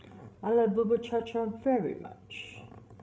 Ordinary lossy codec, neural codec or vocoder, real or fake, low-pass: none; codec, 16 kHz, 16 kbps, FreqCodec, larger model; fake; none